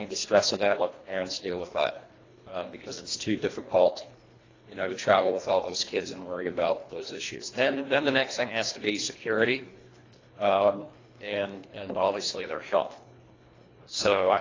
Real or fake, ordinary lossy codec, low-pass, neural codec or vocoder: fake; AAC, 32 kbps; 7.2 kHz; codec, 24 kHz, 1.5 kbps, HILCodec